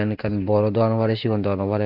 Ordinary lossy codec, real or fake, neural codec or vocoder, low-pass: none; real; none; 5.4 kHz